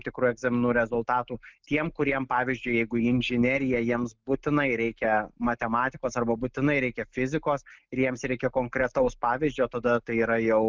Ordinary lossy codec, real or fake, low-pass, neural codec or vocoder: Opus, 24 kbps; real; 7.2 kHz; none